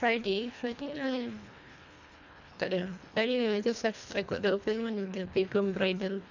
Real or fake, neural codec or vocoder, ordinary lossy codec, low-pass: fake; codec, 24 kHz, 1.5 kbps, HILCodec; none; 7.2 kHz